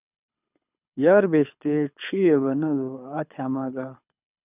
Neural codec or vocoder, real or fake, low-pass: codec, 24 kHz, 6 kbps, HILCodec; fake; 3.6 kHz